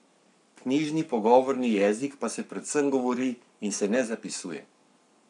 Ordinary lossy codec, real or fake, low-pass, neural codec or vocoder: none; fake; 10.8 kHz; codec, 44.1 kHz, 7.8 kbps, Pupu-Codec